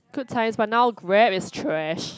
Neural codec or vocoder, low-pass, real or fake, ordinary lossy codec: none; none; real; none